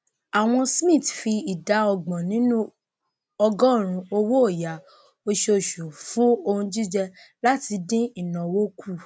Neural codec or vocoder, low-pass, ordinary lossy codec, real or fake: none; none; none; real